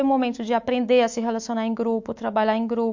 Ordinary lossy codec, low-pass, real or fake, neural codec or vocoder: MP3, 48 kbps; 7.2 kHz; fake; autoencoder, 48 kHz, 128 numbers a frame, DAC-VAE, trained on Japanese speech